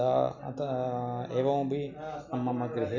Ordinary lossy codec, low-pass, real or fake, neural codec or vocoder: none; 7.2 kHz; real; none